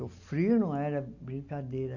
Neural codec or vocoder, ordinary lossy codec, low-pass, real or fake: none; none; 7.2 kHz; real